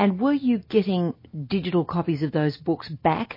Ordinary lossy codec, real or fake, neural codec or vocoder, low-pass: MP3, 24 kbps; real; none; 5.4 kHz